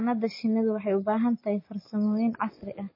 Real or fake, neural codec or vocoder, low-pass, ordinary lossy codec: real; none; 5.4 kHz; MP3, 24 kbps